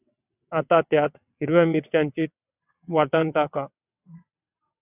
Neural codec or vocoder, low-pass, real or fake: vocoder, 22.05 kHz, 80 mel bands, WaveNeXt; 3.6 kHz; fake